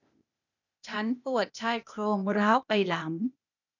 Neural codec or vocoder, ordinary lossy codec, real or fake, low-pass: codec, 16 kHz, 0.8 kbps, ZipCodec; none; fake; 7.2 kHz